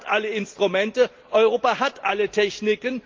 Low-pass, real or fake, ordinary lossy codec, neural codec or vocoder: 7.2 kHz; real; Opus, 32 kbps; none